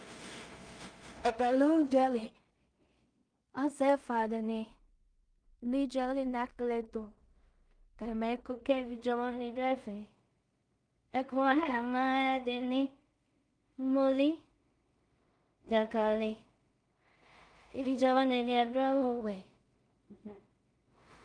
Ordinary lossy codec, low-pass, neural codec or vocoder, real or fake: Opus, 32 kbps; 9.9 kHz; codec, 16 kHz in and 24 kHz out, 0.4 kbps, LongCat-Audio-Codec, two codebook decoder; fake